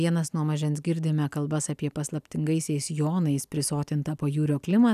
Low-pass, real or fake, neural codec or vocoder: 14.4 kHz; real; none